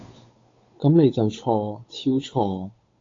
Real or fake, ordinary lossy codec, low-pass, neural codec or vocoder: fake; AAC, 32 kbps; 7.2 kHz; codec, 16 kHz, 8 kbps, FunCodec, trained on Chinese and English, 25 frames a second